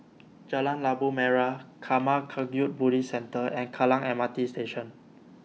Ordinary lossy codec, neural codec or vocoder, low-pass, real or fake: none; none; none; real